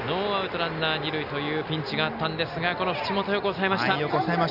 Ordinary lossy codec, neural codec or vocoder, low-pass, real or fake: none; none; 5.4 kHz; real